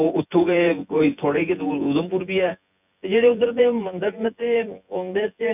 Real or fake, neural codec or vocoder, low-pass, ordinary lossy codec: fake; vocoder, 24 kHz, 100 mel bands, Vocos; 3.6 kHz; none